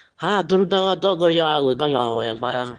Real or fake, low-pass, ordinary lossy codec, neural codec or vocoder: fake; 9.9 kHz; Opus, 16 kbps; autoencoder, 22.05 kHz, a latent of 192 numbers a frame, VITS, trained on one speaker